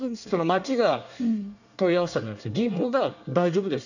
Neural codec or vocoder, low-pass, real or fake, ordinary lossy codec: codec, 24 kHz, 1 kbps, SNAC; 7.2 kHz; fake; none